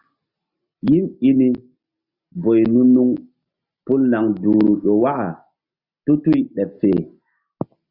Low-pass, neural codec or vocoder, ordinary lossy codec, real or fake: 5.4 kHz; none; Opus, 64 kbps; real